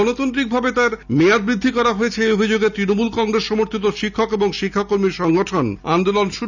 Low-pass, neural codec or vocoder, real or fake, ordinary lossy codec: 7.2 kHz; none; real; none